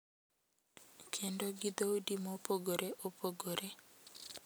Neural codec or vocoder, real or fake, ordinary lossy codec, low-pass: none; real; none; none